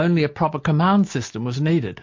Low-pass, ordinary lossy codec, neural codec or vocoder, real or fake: 7.2 kHz; MP3, 48 kbps; none; real